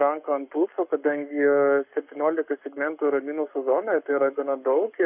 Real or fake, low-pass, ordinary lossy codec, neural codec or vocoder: fake; 3.6 kHz; AAC, 32 kbps; codec, 44.1 kHz, 7.8 kbps, Pupu-Codec